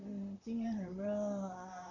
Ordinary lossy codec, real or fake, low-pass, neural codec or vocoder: MP3, 48 kbps; fake; 7.2 kHz; vocoder, 44.1 kHz, 128 mel bands, Pupu-Vocoder